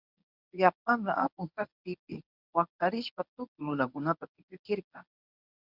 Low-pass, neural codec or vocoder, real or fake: 5.4 kHz; codec, 24 kHz, 0.9 kbps, WavTokenizer, medium speech release version 1; fake